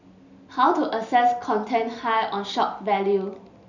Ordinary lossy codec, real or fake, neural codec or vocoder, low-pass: none; real; none; 7.2 kHz